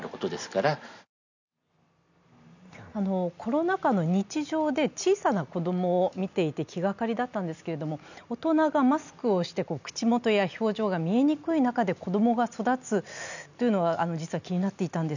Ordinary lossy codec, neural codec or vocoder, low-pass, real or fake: none; none; 7.2 kHz; real